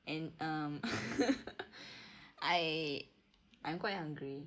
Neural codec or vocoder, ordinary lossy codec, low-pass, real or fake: none; none; none; real